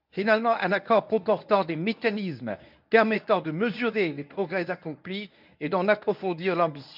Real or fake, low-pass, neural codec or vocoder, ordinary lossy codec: fake; 5.4 kHz; codec, 24 kHz, 0.9 kbps, WavTokenizer, medium speech release version 1; none